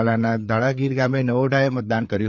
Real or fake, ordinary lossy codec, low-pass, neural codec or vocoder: fake; none; none; codec, 16 kHz, 4 kbps, FreqCodec, larger model